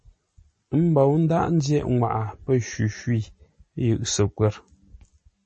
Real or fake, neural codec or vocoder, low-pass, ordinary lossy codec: real; none; 9.9 kHz; MP3, 32 kbps